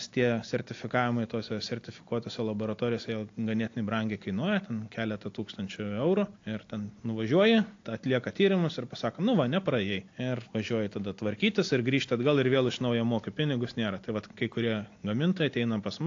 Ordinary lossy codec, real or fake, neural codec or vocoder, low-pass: AAC, 48 kbps; real; none; 7.2 kHz